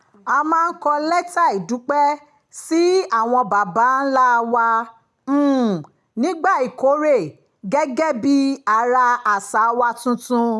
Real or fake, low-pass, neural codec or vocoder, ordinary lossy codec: real; none; none; none